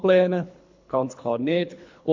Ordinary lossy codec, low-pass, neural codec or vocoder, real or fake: MP3, 48 kbps; 7.2 kHz; codec, 24 kHz, 3 kbps, HILCodec; fake